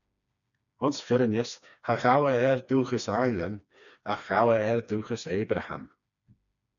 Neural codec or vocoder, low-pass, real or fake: codec, 16 kHz, 2 kbps, FreqCodec, smaller model; 7.2 kHz; fake